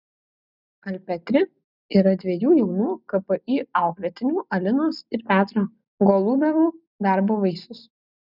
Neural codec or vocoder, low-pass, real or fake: none; 5.4 kHz; real